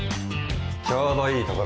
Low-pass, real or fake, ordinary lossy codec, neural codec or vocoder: none; real; none; none